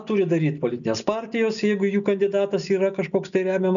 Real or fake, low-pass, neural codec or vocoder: real; 7.2 kHz; none